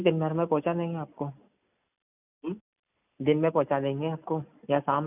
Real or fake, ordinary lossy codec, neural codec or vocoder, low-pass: real; none; none; 3.6 kHz